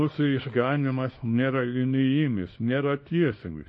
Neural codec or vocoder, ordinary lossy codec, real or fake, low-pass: codec, 24 kHz, 0.9 kbps, WavTokenizer, small release; MP3, 32 kbps; fake; 10.8 kHz